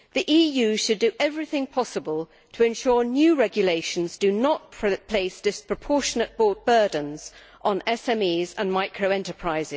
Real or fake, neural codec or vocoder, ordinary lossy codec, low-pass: real; none; none; none